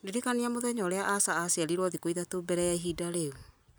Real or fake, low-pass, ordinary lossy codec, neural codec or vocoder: real; none; none; none